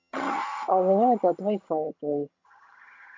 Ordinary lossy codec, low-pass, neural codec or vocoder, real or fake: MP3, 48 kbps; 7.2 kHz; vocoder, 22.05 kHz, 80 mel bands, HiFi-GAN; fake